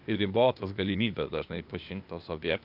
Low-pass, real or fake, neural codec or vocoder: 5.4 kHz; fake; codec, 16 kHz, 0.8 kbps, ZipCodec